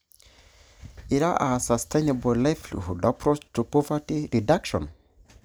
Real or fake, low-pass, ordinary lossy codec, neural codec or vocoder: real; none; none; none